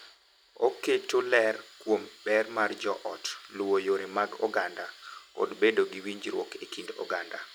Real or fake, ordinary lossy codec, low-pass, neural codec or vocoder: real; none; 19.8 kHz; none